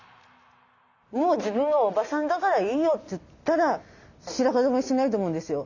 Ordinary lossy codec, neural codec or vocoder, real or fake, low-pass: none; none; real; 7.2 kHz